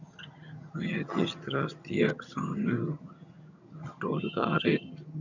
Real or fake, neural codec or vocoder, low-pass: fake; vocoder, 22.05 kHz, 80 mel bands, HiFi-GAN; 7.2 kHz